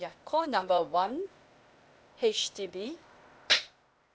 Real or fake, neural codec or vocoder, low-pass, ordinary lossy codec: fake; codec, 16 kHz, 0.8 kbps, ZipCodec; none; none